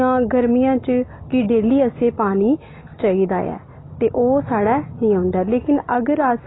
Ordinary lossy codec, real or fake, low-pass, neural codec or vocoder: AAC, 16 kbps; real; 7.2 kHz; none